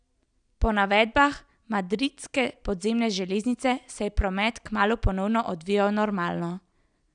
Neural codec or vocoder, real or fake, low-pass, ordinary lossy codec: none; real; 9.9 kHz; none